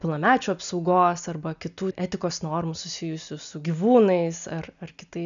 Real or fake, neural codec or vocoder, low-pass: real; none; 7.2 kHz